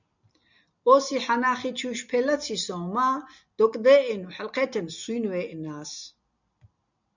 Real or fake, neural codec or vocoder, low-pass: real; none; 7.2 kHz